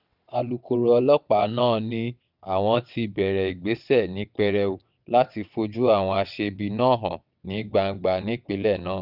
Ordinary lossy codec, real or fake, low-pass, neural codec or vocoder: none; fake; 5.4 kHz; vocoder, 22.05 kHz, 80 mel bands, WaveNeXt